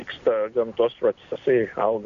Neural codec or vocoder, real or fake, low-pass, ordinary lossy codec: none; real; 7.2 kHz; Opus, 64 kbps